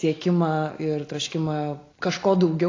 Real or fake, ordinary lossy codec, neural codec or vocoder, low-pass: real; AAC, 32 kbps; none; 7.2 kHz